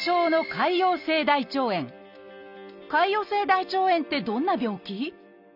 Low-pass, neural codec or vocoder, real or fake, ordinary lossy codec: 5.4 kHz; none; real; none